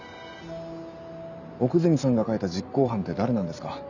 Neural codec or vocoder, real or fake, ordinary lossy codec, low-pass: none; real; none; 7.2 kHz